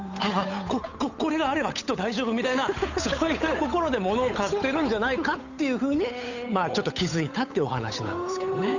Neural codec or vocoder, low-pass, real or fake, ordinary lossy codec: codec, 16 kHz, 8 kbps, FunCodec, trained on Chinese and English, 25 frames a second; 7.2 kHz; fake; none